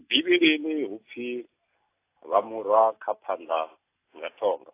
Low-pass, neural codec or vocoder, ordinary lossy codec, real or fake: 3.6 kHz; none; AAC, 24 kbps; real